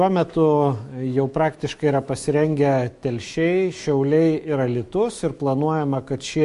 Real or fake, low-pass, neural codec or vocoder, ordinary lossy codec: fake; 14.4 kHz; autoencoder, 48 kHz, 128 numbers a frame, DAC-VAE, trained on Japanese speech; MP3, 48 kbps